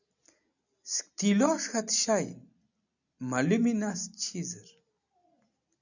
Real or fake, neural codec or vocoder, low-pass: real; none; 7.2 kHz